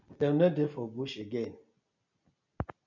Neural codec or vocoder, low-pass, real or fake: none; 7.2 kHz; real